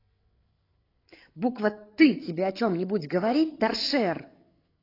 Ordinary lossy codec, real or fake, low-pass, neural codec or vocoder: AAC, 24 kbps; fake; 5.4 kHz; codec, 16 kHz, 8 kbps, FreqCodec, larger model